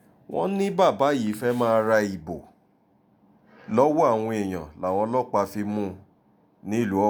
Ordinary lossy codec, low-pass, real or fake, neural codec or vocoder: none; none; real; none